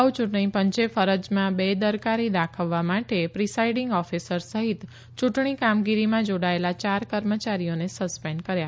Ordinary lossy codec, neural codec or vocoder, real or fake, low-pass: none; none; real; none